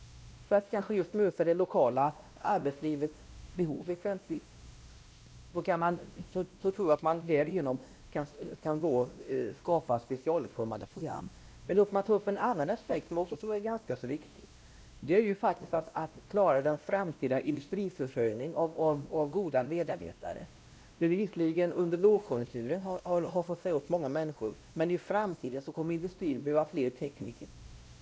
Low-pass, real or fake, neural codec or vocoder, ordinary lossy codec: none; fake; codec, 16 kHz, 1 kbps, X-Codec, WavLM features, trained on Multilingual LibriSpeech; none